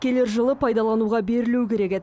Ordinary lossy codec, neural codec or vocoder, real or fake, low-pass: none; none; real; none